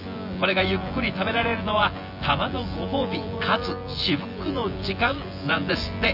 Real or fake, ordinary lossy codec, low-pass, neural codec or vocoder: fake; none; 5.4 kHz; vocoder, 24 kHz, 100 mel bands, Vocos